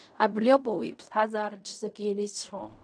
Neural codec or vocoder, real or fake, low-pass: codec, 16 kHz in and 24 kHz out, 0.4 kbps, LongCat-Audio-Codec, fine tuned four codebook decoder; fake; 9.9 kHz